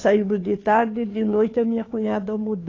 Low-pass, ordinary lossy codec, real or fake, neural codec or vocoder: 7.2 kHz; AAC, 32 kbps; fake; codec, 16 kHz, 2 kbps, FunCodec, trained on Chinese and English, 25 frames a second